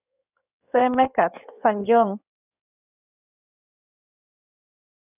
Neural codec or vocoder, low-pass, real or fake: codec, 16 kHz in and 24 kHz out, 2.2 kbps, FireRedTTS-2 codec; 3.6 kHz; fake